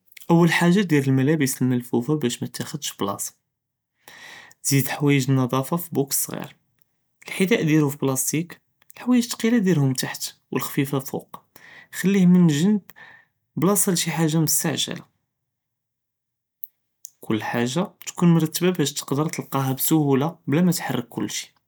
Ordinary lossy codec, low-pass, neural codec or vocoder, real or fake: none; none; none; real